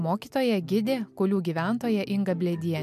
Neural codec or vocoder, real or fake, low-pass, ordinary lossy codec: none; real; 14.4 kHz; MP3, 96 kbps